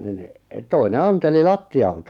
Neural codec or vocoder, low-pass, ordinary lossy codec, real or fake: codec, 44.1 kHz, 7.8 kbps, DAC; 19.8 kHz; none; fake